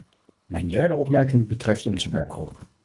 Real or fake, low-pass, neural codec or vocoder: fake; 10.8 kHz; codec, 24 kHz, 1.5 kbps, HILCodec